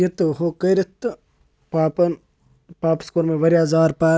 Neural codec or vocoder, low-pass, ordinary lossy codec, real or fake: none; none; none; real